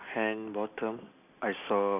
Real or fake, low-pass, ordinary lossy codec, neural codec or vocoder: real; 3.6 kHz; none; none